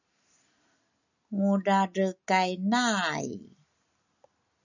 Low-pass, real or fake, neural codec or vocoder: 7.2 kHz; real; none